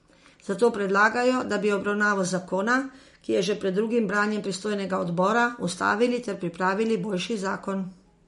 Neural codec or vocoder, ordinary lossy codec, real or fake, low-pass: none; MP3, 48 kbps; real; 10.8 kHz